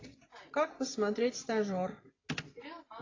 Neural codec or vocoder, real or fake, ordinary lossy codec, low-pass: none; real; AAC, 32 kbps; 7.2 kHz